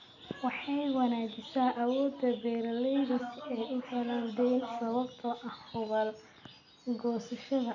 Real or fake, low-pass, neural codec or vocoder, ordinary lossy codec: real; 7.2 kHz; none; none